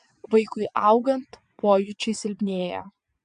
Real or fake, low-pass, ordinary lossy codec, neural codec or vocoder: fake; 9.9 kHz; MP3, 64 kbps; vocoder, 22.05 kHz, 80 mel bands, WaveNeXt